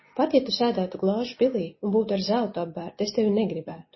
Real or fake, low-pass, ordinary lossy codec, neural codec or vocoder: real; 7.2 kHz; MP3, 24 kbps; none